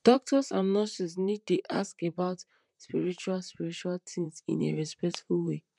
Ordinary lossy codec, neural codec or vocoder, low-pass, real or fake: MP3, 96 kbps; vocoder, 44.1 kHz, 128 mel bands, Pupu-Vocoder; 10.8 kHz; fake